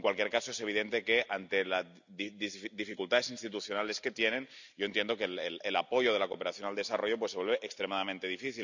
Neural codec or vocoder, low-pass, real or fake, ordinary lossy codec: none; 7.2 kHz; real; none